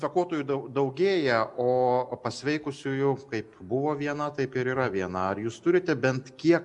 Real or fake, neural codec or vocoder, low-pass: real; none; 10.8 kHz